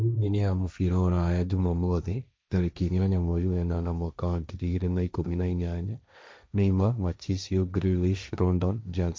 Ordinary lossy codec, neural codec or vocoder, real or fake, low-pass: none; codec, 16 kHz, 1.1 kbps, Voila-Tokenizer; fake; none